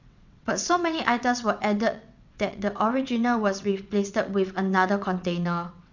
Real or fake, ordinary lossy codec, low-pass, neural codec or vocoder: fake; none; 7.2 kHz; vocoder, 22.05 kHz, 80 mel bands, WaveNeXt